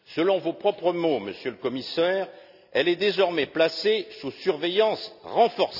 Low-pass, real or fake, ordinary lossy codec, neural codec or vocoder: 5.4 kHz; real; none; none